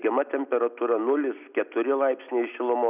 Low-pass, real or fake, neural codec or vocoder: 3.6 kHz; real; none